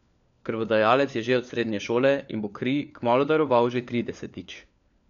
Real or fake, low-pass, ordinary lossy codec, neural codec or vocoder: fake; 7.2 kHz; none; codec, 16 kHz, 4 kbps, FunCodec, trained on LibriTTS, 50 frames a second